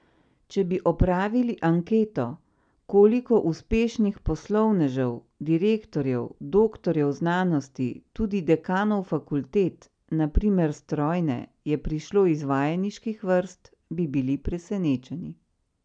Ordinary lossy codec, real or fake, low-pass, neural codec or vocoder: none; real; 9.9 kHz; none